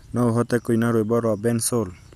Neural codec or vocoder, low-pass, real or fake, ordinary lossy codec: none; 14.4 kHz; real; none